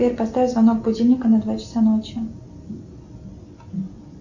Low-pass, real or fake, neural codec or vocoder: 7.2 kHz; real; none